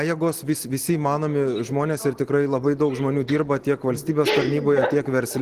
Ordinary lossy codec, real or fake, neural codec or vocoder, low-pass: Opus, 16 kbps; real; none; 14.4 kHz